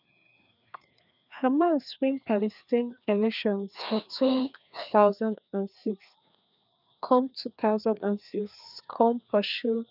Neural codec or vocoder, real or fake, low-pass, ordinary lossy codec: codec, 16 kHz, 2 kbps, FreqCodec, larger model; fake; 5.4 kHz; none